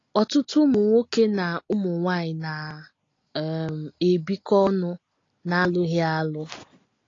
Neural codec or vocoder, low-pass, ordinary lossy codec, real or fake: none; 7.2 kHz; AAC, 32 kbps; real